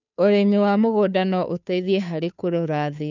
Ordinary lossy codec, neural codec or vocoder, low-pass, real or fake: none; codec, 16 kHz, 2 kbps, FunCodec, trained on Chinese and English, 25 frames a second; 7.2 kHz; fake